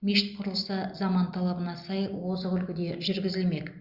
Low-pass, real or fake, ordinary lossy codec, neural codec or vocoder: 5.4 kHz; real; Opus, 64 kbps; none